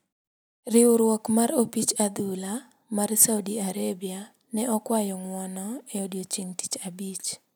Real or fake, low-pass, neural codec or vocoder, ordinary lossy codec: real; none; none; none